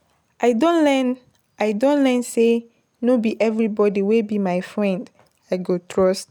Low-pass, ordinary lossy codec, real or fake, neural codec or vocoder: 19.8 kHz; none; real; none